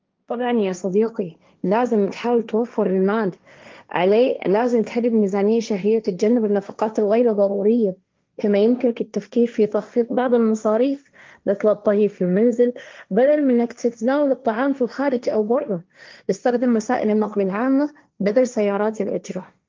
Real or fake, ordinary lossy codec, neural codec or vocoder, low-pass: fake; Opus, 24 kbps; codec, 16 kHz, 1.1 kbps, Voila-Tokenizer; 7.2 kHz